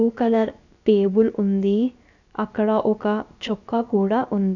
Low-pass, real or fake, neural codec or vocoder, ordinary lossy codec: 7.2 kHz; fake; codec, 16 kHz, about 1 kbps, DyCAST, with the encoder's durations; none